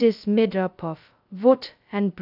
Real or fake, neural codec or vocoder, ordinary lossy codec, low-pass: fake; codec, 16 kHz, 0.2 kbps, FocalCodec; AAC, 48 kbps; 5.4 kHz